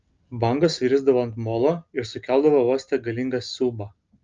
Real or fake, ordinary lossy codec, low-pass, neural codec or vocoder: real; Opus, 24 kbps; 7.2 kHz; none